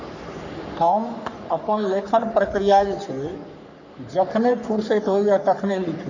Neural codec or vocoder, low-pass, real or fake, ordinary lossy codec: codec, 44.1 kHz, 3.4 kbps, Pupu-Codec; 7.2 kHz; fake; none